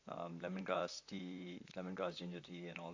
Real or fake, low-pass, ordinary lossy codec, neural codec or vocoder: fake; 7.2 kHz; AAC, 48 kbps; vocoder, 44.1 kHz, 128 mel bands, Pupu-Vocoder